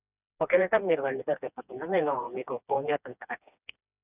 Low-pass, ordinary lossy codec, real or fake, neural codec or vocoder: 3.6 kHz; none; fake; codec, 16 kHz, 2 kbps, FreqCodec, smaller model